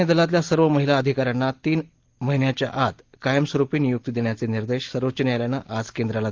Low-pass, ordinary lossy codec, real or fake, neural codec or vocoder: 7.2 kHz; Opus, 16 kbps; real; none